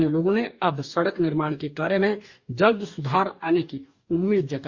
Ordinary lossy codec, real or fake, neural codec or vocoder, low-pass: Opus, 64 kbps; fake; codec, 44.1 kHz, 2.6 kbps, DAC; 7.2 kHz